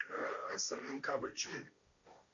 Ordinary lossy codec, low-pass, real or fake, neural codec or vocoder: AAC, 64 kbps; 7.2 kHz; fake; codec, 16 kHz, 1.1 kbps, Voila-Tokenizer